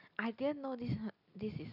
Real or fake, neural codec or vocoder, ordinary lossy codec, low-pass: real; none; none; 5.4 kHz